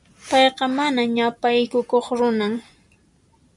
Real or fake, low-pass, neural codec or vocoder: real; 10.8 kHz; none